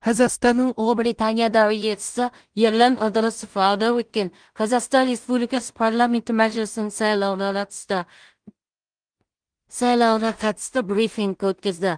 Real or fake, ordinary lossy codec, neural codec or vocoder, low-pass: fake; Opus, 24 kbps; codec, 16 kHz in and 24 kHz out, 0.4 kbps, LongCat-Audio-Codec, two codebook decoder; 9.9 kHz